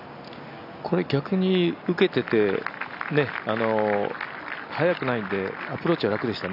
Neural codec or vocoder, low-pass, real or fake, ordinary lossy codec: none; 5.4 kHz; real; none